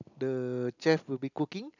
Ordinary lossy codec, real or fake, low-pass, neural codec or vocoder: none; real; 7.2 kHz; none